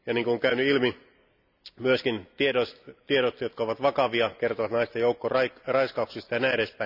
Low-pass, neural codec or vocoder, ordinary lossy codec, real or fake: 5.4 kHz; none; none; real